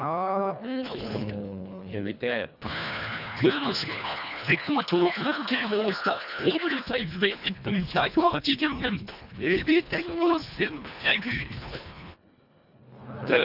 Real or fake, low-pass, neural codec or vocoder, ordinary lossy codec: fake; 5.4 kHz; codec, 24 kHz, 1.5 kbps, HILCodec; none